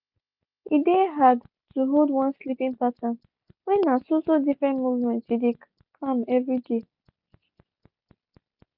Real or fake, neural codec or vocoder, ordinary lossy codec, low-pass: real; none; none; 5.4 kHz